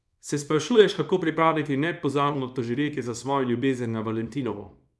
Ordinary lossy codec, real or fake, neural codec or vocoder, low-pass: none; fake; codec, 24 kHz, 0.9 kbps, WavTokenizer, small release; none